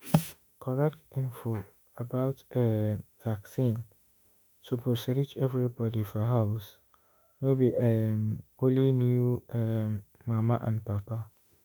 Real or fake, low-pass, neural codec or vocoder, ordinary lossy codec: fake; 19.8 kHz; autoencoder, 48 kHz, 32 numbers a frame, DAC-VAE, trained on Japanese speech; none